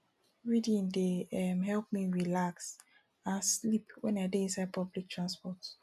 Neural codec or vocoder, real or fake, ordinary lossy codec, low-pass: none; real; none; 14.4 kHz